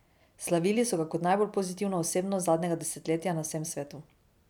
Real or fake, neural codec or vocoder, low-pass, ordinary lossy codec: fake; vocoder, 44.1 kHz, 128 mel bands every 256 samples, BigVGAN v2; 19.8 kHz; none